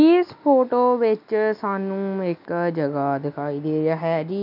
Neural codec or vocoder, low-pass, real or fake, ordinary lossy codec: none; 5.4 kHz; real; none